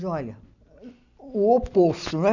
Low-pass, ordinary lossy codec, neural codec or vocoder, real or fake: 7.2 kHz; none; none; real